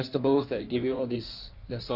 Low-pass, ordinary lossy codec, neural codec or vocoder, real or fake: 5.4 kHz; none; codec, 16 kHz in and 24 kHz out, 1.1 kbps, FireRedTTS-2 codec; fake